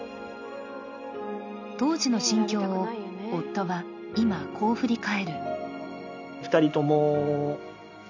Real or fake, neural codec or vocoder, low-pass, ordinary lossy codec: real; none; 7.2 kHz; none